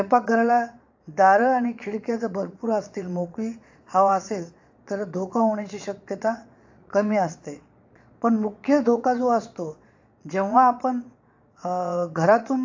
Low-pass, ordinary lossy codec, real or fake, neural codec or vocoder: 7.2 kHz; AAC, 48 kbps; fake; vocoder, 22.05 kHz, 80 mel bands, Vocos